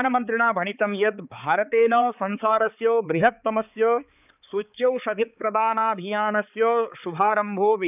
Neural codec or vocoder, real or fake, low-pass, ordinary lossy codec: codec, 16 kHz, 4 kbps, X-Codec, HuBERT features, trained on balanced general audio; fake; 3.6 kHz; none